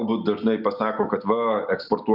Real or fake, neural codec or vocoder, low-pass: real; none; 5.4 kHz